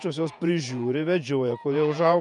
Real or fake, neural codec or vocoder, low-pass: fake; vocoder, 48 kHz, 128 mel bands, Vocos; 10.8 kHz